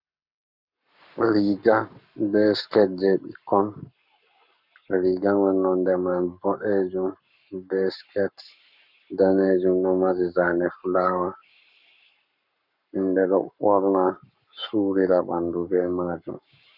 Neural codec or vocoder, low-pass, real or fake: codec, 44.1 kHz, 7.8 kbps, Pupu-Codec; 5.4 kHz; fake